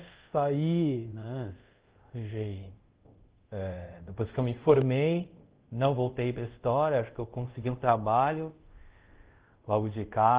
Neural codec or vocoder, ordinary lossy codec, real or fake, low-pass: codec, 24 kHz, 0.5 kbps, DualCodec; Opus, 16 kbps; fake; 3.6 kHz